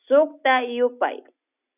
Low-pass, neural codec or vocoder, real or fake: 3.6 kHz; none; real